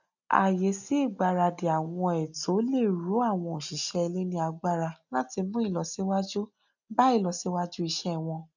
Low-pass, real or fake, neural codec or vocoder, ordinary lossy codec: 7.2 kHz; real; none; none